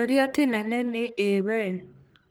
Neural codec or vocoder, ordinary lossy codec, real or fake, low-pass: codec, 44.1 kHz, 1.7 kbps, Pupu-Codec; none; fake; none